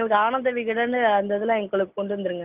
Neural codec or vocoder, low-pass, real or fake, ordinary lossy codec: none; 3.6 kHz; real; Opus, 24 kbps